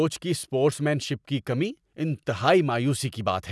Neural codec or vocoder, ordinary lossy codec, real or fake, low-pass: none; none; real; none